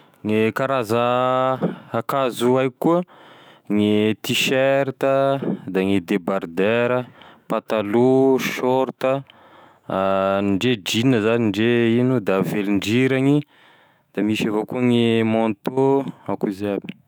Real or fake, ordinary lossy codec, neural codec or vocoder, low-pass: fake; none; autoencoder, 48 kHz, 128 numbers a frame, DAC-VAE, trained on Japanese speech; none